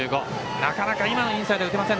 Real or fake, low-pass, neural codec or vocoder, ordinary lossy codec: real; none; none; none